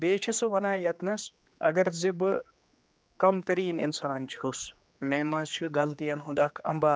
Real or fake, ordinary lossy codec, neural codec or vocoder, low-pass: fake; none; codec, 16 kHz, 2 kbps, X-Codec, HuBERT features, trained on general audio; none